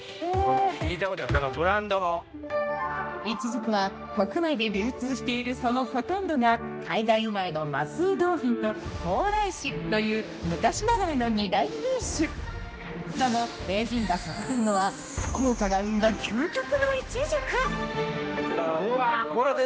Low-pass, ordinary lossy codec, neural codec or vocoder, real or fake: none; none; codec, 16 kHz, 1 kbps, X-Codec, HuBERT features, trained on general audio; fake